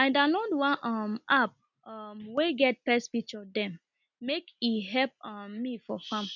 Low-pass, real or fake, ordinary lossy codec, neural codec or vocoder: 7.2 kHz; real; none; none